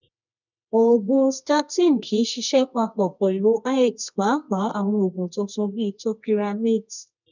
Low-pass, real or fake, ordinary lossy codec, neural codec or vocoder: 7.2 kHz; fake; none; codec, 24 kHz, 0.9 kbps, WavTokenizer, medium music audio release